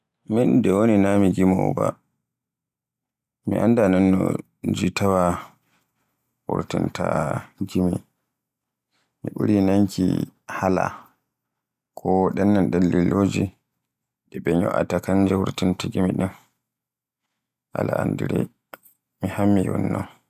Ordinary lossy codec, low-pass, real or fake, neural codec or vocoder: none; 14.4 kHz; real; none